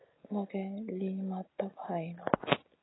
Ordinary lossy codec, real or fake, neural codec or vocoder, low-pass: AAC, 16 kbps; real; none; 7.2 kHz